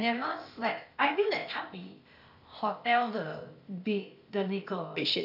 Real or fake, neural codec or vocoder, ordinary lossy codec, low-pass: fake; codec, 16 kHz, 0.8 kbps, ZipCodec; none; 5.4 kHz